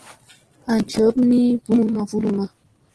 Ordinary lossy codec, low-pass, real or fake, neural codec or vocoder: Opus, 16 kbps; 10.8 kHz; real; none